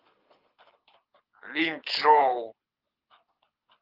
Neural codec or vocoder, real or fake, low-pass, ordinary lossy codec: codec, 24 kHz, 6 kbps, HILCodec; fake; 5.4 kHz; Opus, 24 kbps